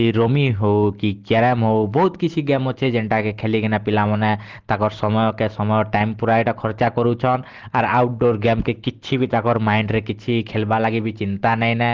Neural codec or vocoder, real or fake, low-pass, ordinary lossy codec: none; real; 7.2 kHz; Opus, 16 kbps